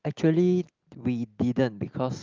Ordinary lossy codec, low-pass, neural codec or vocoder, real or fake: Opus, 32 kbps; 7.2 kHz; none; real